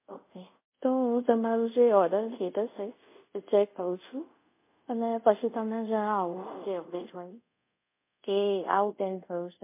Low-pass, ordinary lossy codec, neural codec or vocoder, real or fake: 3.6 kHz; MP3, 24 kbps; codec, 24 kHz, 0.5 kbps, DualCodec; fake